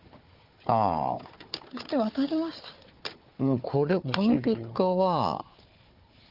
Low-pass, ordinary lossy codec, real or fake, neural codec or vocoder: 5.4 kHz; Opus, 24 kbps; fake; codec, 16 kHz, 4 kbps, FunCodec, trained on Chinese and English, 50 frames a second